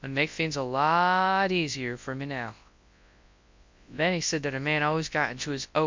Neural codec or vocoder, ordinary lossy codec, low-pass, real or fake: codec, 24 kHz, 0.9 kbps, WavTokenizer, large speech release; MP3, 64 kbps; 7.2 kHz; fake